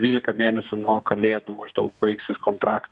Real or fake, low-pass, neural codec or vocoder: fake; 10.8 kHz; codec, 32 kHz, 1.9 kbps, SNAC